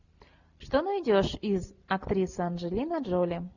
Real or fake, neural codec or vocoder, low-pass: real; none; 7.2 kHz